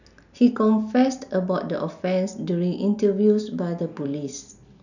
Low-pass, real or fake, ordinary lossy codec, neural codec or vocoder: 7.2 kHz; real; none; none